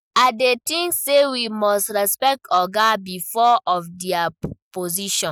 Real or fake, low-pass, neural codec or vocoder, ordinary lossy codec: real; none; none; none